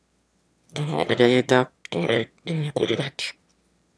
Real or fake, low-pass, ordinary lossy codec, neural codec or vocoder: fake; none; none; autoencoder, 22.05 kHz, a latent of 192 numbers a frame, VITS, trained on one speaker